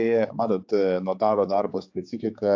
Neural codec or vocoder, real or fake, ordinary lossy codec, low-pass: codec, 16 kHz, 4 kbps, X-Codec, HuBERT features, trained on general audio; fake; AAC, 48 kbps; 7.2 kHz